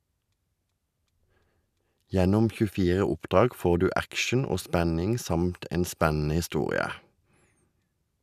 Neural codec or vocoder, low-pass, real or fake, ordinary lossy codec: none; 14.4 kHz; real; none